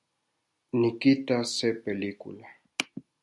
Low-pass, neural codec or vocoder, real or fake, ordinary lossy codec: 10.8 kHz; none; real; MP3, 64 kbps